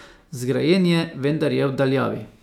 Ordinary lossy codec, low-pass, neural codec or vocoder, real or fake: none; 19.8 kHz; autoencoder, 48 kHz, 128 numbers a frame, DAC-VAE, trained on Japanese speech; fake